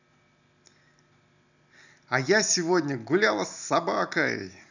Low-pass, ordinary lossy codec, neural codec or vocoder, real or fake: 7.2 kHz; none; none; real